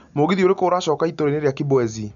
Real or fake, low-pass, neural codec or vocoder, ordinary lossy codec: real; 7.2 kHz; none; none